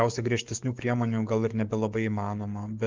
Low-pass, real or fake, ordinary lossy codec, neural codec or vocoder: 7.2 kHz; fake; Opus, 24 kbps; codec, 44.1 kHz, 7.8 kbps, DAC